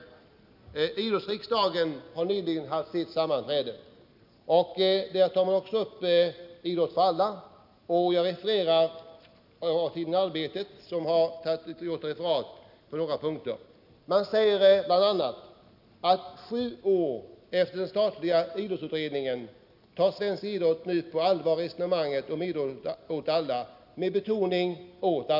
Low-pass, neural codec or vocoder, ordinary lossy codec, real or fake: 5.4 kHz; none; none; real